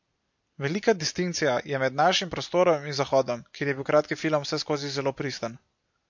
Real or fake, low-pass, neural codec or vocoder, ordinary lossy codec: real; 7.2 kHz; none; MP3, 48 kbps